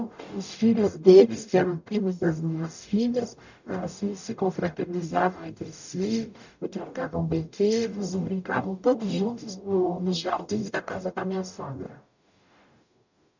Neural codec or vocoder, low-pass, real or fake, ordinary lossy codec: codec, 44.1 kHz, 0.9 kbps, DAC; 7.2 kHz; fake; none